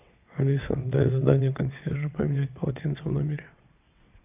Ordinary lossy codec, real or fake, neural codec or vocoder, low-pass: AAC, 32 kbps; fake; vocoder, 22.05 kHz, 80 mel bands, WaveNeXt; 3.6 kHz